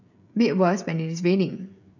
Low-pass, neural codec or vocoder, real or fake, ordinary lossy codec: 7.2 kHz; codec, 16 kHz, 16 kbps, FreqCodec, smaller model; fake; none